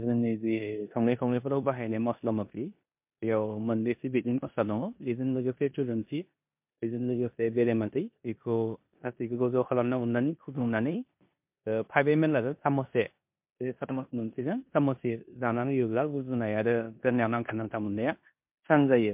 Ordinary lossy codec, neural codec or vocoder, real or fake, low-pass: MP3, 32 kbps; codec, 16 kHz in and 24 kHz out, 0.9 kbps, LongCat-Audio-Codec, four codebook decoder; fake; 3.6 kHz